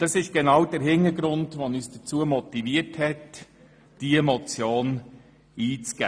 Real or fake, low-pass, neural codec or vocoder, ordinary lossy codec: real; none; none; none